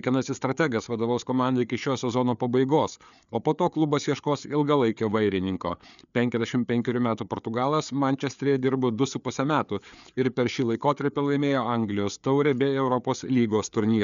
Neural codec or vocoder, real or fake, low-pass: codec, 16 kHz, 4 kbps, FreqCodec, larger model; fake; 7.2 kHz